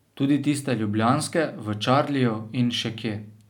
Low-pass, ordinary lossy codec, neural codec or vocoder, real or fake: 19.8 kHz; none; none; real